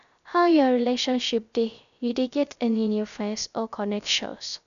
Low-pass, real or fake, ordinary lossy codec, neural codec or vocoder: 7.2 kHz; fake; none; codec, 16 kHz, 0.3 kbps, FocalCodec